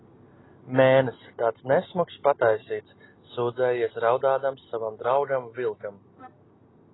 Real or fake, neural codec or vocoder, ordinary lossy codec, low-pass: real; none; AAC, 16 kbps; 7.2 kHz